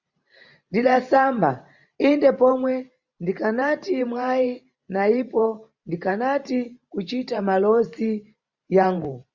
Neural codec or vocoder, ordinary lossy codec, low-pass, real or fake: vocoder, 44.1 kHz, 128 mel bands every 512 samples, BigVGAN v2; Opus, 64 kbps; 7.2 kHz; fake